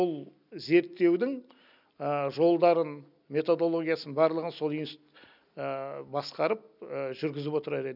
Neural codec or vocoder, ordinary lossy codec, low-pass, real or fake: none; none; 5.4 kHz; real